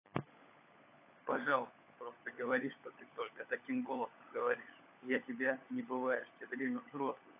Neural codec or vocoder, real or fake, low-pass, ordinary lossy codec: codec, 16 kHz, 16 kbps, FunCodec, trained on LibriTTS, 50 frames a second; fake; 3.6 kHz; AAC, 24 kbps